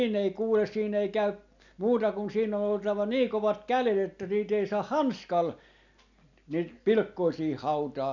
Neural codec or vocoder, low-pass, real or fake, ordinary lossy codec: none; 7.2 kHz; real; none